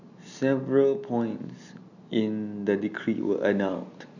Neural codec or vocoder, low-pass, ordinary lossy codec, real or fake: none; 7.2 kHz; none; real